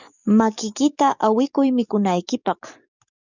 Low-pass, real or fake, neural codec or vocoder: 7.2 kHz; fake; codec, 44.1 kHz, 7.8 kbps, DAC